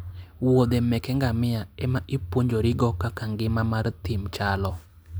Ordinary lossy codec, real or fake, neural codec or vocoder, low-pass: none; real; none; none